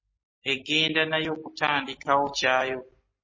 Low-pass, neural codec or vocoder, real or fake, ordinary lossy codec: 7.2 kHz; none; real; MP3, 32 kbps